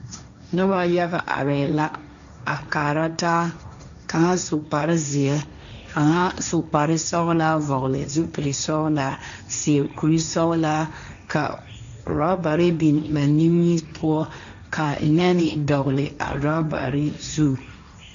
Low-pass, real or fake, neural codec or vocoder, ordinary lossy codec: 7.2 kHz; fake; codec, 16 kHz, 1.1 kbps, Voila-Tokenizer; AAC, 96 kbps